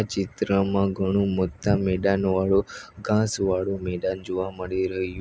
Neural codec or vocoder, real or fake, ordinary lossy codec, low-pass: none; real; none; none